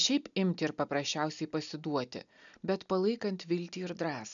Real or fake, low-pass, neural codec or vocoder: real; 7.2 kHz; none